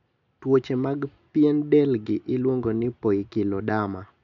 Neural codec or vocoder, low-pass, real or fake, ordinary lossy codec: none; 7.2 kHz; real; none